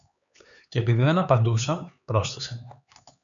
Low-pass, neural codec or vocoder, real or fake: 7.2 kHz; codec, 16 kHz, 4 kbps, X-Codec, HuBERT features, trained on LibriSpeech; fake